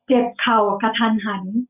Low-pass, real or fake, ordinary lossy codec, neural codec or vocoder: 3.6 kHz; real; none; none